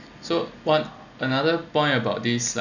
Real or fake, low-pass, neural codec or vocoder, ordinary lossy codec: real; 7.2 kHz; none; none